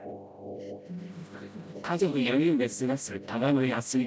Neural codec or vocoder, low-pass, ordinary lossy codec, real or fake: codec, 16 kHz, 0.5 kbps, FreqCodec, smaller model; none; none; fake